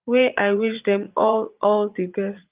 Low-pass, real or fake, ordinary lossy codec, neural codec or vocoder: 3.6 kHz; fake; Opus, 24 kbps; vocoder, 44.1 kHz, 80 mel bands, Vocos